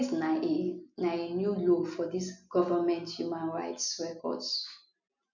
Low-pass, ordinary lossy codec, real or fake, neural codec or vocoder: 7.2 kHz; none; real; none